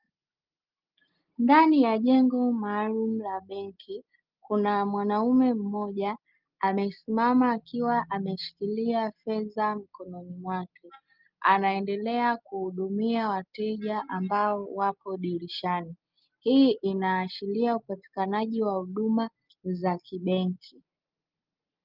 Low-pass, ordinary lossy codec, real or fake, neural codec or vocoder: 5.4 kHz; Opus, 24 kbps; real; none